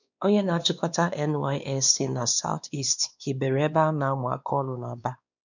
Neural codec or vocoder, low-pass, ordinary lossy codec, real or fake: codec, 16 kHz, 2 kbps, X-Codec, WavLM features, trained on Multilingual LibriSpeech; 7.2 kHz; none; fake